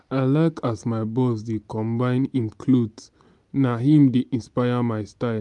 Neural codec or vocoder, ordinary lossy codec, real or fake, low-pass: none; MP3, 96 kbps; real; 10.8 kHz